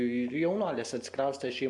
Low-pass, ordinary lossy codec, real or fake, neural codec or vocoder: 10.8 kHz; MP3, 64 kbps; real; none